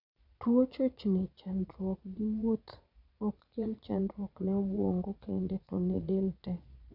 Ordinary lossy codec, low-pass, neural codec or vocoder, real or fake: none; 5.4 kHz; vocoder, 22.05 kHz, 80 mel bands, Vocos; fake